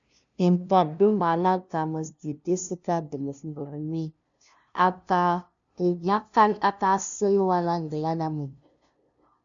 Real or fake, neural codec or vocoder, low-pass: fake; codec, 16 kHz, 0.5 kbps, FunCodec, trained on LibriTTS, 25 frames a second; 7.2 kHz